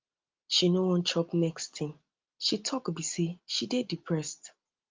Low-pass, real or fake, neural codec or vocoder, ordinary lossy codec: 7.2 kHz; real; none; Opus, 24 kbps